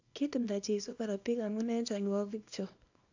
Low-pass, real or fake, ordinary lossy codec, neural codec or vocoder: 7.2 kHz; fake; AAC, 48 kbps; codec, 24 kHz, 0.9 kbps, WavTokenizer, small release